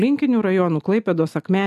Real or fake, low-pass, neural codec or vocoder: real; 14.4 kHz; none